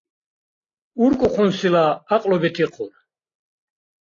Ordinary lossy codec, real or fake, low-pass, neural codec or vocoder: AAC, 32 kbps; real; 7.2 kHz; none